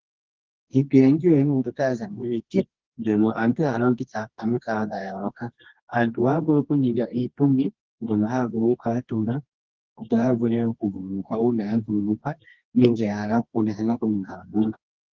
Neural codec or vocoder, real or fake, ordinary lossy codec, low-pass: codec, 24 kHz, 0.9 kbps, WavTokenizer, medium music audio release; fake; Opus, 16 kbps; 7.2 kHz